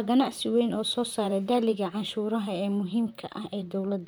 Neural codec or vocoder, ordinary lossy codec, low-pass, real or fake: vocoder, 44.1 kHz, 128 mel bands, Pupu-Vocoder; none; none; fake